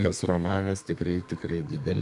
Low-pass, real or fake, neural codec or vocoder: 10.8 kHz; fake; codec, 32 kHz, 1.9 kbps, SNAC